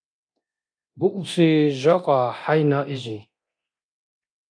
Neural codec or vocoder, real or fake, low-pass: codec, 24 kHz, 0.9 kbps, DualCodec; fake; 9.9 kHz